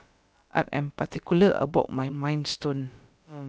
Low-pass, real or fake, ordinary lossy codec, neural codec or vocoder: none; fake; none; codec, 16 kHz, about 1 kbps, DyCAST, with the encoder's durations